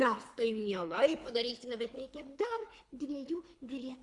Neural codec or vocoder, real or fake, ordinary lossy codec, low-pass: codec, 24 kHz, 3 kbps, HILCodec; fake; Opus, 64 kbps; 10.8 kHz